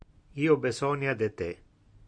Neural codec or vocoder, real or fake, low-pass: none; real; 9.9 kHz